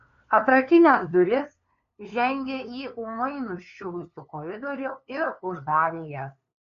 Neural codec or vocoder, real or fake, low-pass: codec, 16 kHz, 2 kbps, FunCodec, trained on LibriTTS, 25 frames a second; fake; 7.2 kHz